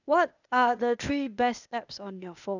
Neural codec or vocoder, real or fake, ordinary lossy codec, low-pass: codec, 16 kHz, 0.8 kbps, ZipCodec; fake; none; 7.2 kHz